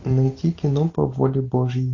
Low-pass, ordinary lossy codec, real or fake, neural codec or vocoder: 7.2 kHz; AAC, 48 kbps; real; none